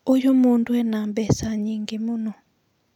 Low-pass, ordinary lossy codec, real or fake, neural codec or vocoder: 19.8 kHz; none; real; none